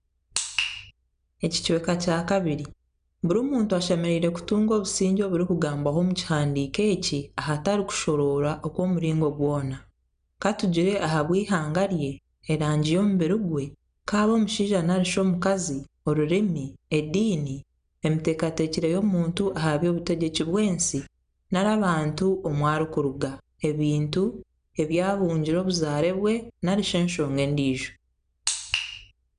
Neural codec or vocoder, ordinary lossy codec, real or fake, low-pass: none; none; real; 9.9 kHz